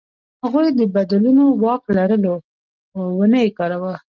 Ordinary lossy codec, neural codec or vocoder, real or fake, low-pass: Opus, 32 kbps; none; real; 7.2 kHz